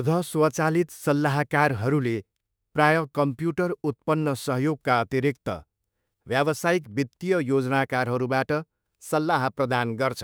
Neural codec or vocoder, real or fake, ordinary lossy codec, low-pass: autoencoder, 48 kHz, 32 numbers a frame, DAC-VAE, trained on Japanese speech; fake; none; none